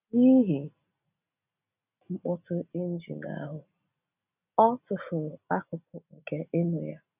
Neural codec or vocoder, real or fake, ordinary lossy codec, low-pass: none; real; none; 3.6 kHz